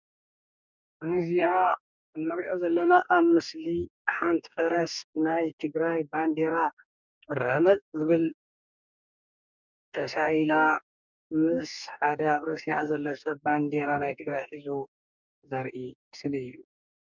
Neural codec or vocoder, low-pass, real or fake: codec, 44.1 kHz, 2.6 kbps, DAC; 7.2 kHz; fake